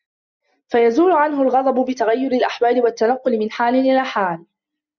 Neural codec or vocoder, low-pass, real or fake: none; 7.2 kHz; real